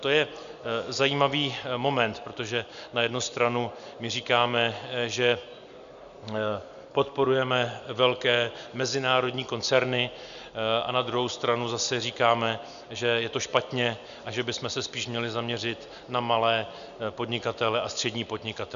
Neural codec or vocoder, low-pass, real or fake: none; 7.2 kHz; real